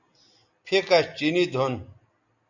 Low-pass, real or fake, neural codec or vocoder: 7.2 kHz; real; none